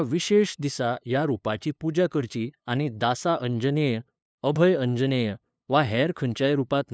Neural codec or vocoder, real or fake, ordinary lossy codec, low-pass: codec, 16 kHz, 2 kbps, FunCodec, trained on LibriTTS, 25 frames a second; fake; none; none